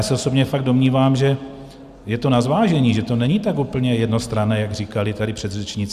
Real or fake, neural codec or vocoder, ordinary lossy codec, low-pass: real; none; Opus, 64 kbps; 14.4 kHz